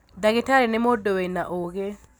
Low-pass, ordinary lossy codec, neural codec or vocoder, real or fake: none; none; none; real